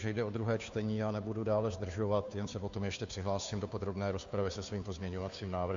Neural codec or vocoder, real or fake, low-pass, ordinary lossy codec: codec, 16 kHz, 2 kbps, FunCodec, trained on Chinese and English, 25 frames a second; fake; 7.2 kHz; AAC, 64 kbps